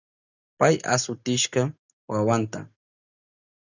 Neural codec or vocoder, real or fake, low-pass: none; real; 7.2 kHz